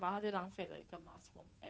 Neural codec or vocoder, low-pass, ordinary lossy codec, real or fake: codec, 16 kHz, 2 kbps, FunCodec, trained on Chinese and English, 25 frames a second; none; none; fake